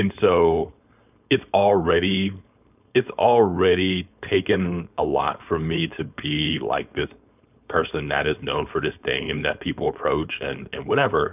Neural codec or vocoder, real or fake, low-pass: codec, 16 kHz, 4.8 kbps, FACodec; fake; 3.6 kHz